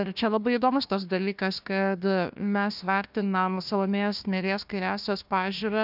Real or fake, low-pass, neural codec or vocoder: fake; 5.4 kHz; codec, 16 kHz, 1 kbps, FunCodec, trained on Chinese and English, 50 frames a second